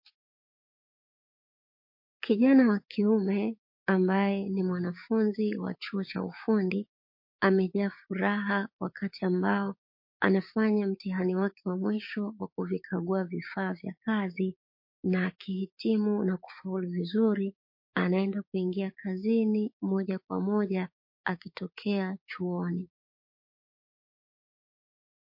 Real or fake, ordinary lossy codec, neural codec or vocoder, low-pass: fake; MP3, 32 kbps; codec, 16 kHz, 6 kbps, DAC; 5.4 kHz